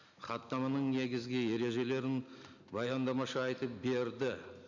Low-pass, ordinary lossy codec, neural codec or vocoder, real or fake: 7.2 kHz; none; none; real